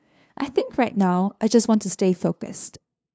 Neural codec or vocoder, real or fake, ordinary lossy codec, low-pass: codec, 16 kHz, 2 kbps, FunCodec, trained on LibriTTS, 25 frames a second; fake; none; none